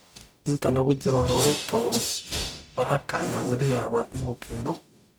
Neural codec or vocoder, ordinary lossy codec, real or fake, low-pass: codec, 44.1 kHz, 0.9 kbps, DAC; none; fake; none